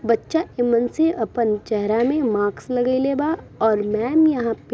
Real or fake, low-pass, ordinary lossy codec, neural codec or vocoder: real; none; none; none